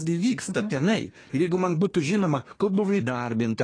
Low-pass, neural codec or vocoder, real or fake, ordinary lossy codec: 9.9 kHz; codec, 24 kHz, 1 kbps, SNAC; fake; AAC, 32 kbps